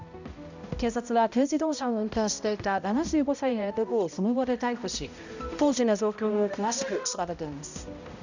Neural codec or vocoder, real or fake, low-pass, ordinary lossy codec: codec, 16 kHz, 0.5 kbps, X-Codec, HuBERT features, trained on balanced general audio; fake; 7.2 kHz; none